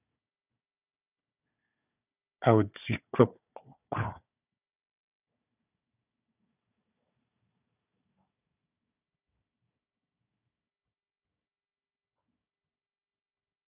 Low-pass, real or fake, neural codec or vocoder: 3.6 kHz; fake; codec, 16 kHz, 4 kbps, FunCodec, trained on Chinese and English, 50 frames a second